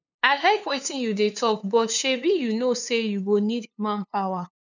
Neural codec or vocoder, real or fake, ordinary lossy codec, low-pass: codec, 16 kHz, 2 kbps, FunCodec, trained on LibriTTS, 25 frames a second; fake; none; 7.2 kHz